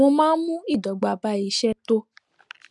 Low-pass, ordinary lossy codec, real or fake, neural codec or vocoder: 10.8 kHz; none; real; none